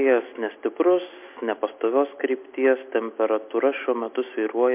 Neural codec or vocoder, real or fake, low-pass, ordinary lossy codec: none; real; 3.6 kHz; MP3, 32 kbps